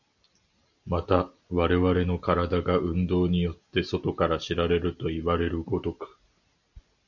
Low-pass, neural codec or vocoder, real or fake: 7.2 kHz; none; real